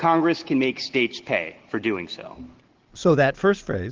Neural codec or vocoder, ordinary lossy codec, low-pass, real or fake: none; Opus, 16 kbps; 7.2 kHz; real